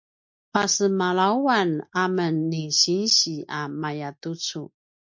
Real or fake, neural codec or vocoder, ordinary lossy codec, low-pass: real; none; MP3, 48 kbps; 7.2 kHz